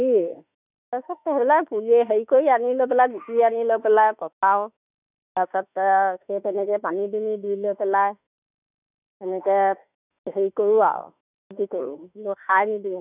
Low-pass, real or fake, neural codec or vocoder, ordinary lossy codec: 3.6 kHz; fake; autoencoder, 48 kHz, 32 numbers a frame, DAC-VAE, trained on Japanese speech; none